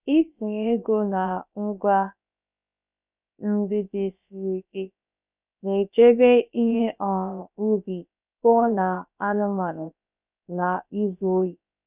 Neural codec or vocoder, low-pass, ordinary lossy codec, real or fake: codec, 16 kHz, about 1 kbps, DyCAST, with the encoder's durations; 3.6 kHz; none; fake